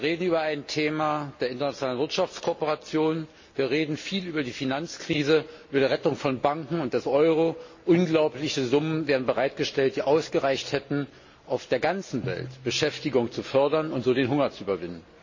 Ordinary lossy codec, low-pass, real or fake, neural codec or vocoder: MP3, 32 kbps; 7.2 kHz; real; none